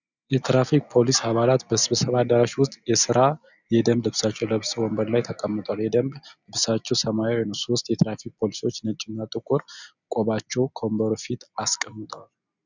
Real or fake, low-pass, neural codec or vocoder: real; 7.2 kHz; none